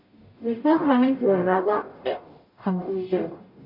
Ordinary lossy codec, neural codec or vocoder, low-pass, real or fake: MP3, 32 kbps; codec, 44.1 kHz, 0.9 kbps, DAC; 5.4 kHz; fake